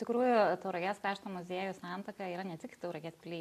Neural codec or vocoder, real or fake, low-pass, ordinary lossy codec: none; real; 14.4 kHz; AAC, 64 kbps